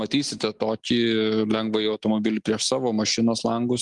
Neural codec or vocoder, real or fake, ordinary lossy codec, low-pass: none; real; Opus, 24 kbps; 10.8 kHz